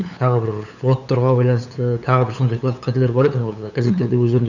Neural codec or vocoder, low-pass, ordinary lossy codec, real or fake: codec, 16 kHz, 8 kbps, FunCodec, trained on LibriTTS, 25 frames a second; 7.2 kHz; none; fake